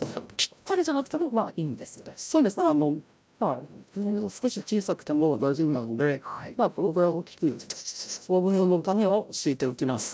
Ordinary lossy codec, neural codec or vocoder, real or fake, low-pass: none; codec, 16 kHz, 0.5 kbps, FreqCodec, larger model; fake; none